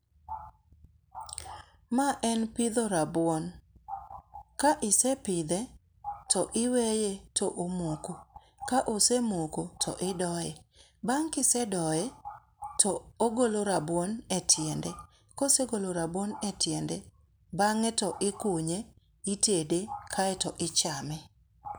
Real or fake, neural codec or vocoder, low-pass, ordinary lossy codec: real; none; none; none